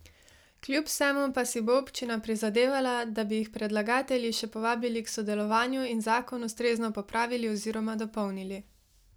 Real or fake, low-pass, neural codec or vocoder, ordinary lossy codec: real; none; none; none